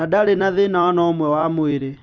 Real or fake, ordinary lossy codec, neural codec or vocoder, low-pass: fake; none; vocoder, 44.1 kHz, 128 mel bands every 256 samples, BigVGAN v2; 7.2 kHz